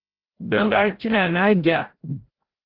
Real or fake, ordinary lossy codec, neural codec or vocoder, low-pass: fake; Opus, 16 kbps; codec, 16 kHz, 0.5 kbps, FreqCodec, larger model; 5.4 kHz